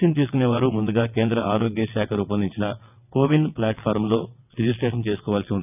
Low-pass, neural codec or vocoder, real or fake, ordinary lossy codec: 3.6 kHz; vocoder, 22.05 kHz, 80 mel bands, WaveNeXt; fake; none